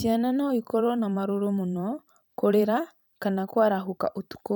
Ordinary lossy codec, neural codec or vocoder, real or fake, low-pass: none; vocoder, 44.1 kHz, 128 mel bands every 512 samples, BigVGAN v2; fake; none